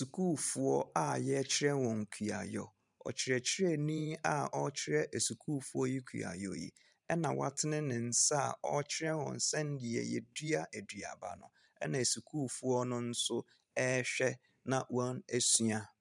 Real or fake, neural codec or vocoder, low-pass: fake; vocoder, 44.1 kHz, 128 mel bands every 512 samples, BigVGAN v2; 10.8 kHz